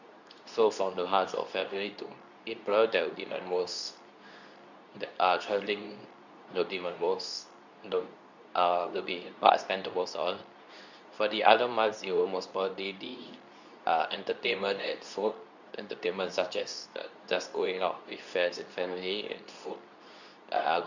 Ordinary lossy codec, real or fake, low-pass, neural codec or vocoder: none; fake; 7.2 kHz; codec, 24 kHz, 0.9 kbps, WavTokenizer, medium speech release version 2